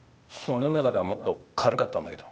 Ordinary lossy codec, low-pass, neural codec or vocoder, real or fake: none; none; codec, 16 kHz, 0.8 kbps, ZipCodec; fake